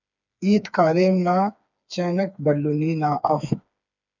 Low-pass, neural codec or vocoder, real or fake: 7.2 kHz; codec, 16 kHz, 4 kbps, FreqCodec, smaller model; fake